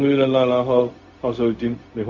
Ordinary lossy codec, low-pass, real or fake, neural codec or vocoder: none; 7.2 kHz; fake; codec, 16 kHz, 0.4 kbps, LongCat-Audio-Codec